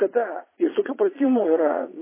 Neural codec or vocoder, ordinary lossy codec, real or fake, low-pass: vocoder, 22.05 kHz, 80 mel bands, Vocos; MP3, 16 kbps; fake; 3.6 kHz